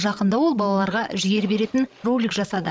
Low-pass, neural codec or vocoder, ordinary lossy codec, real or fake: none; codec, 16 kHz, 16 kbps, FreqCodec, larger model; none; fake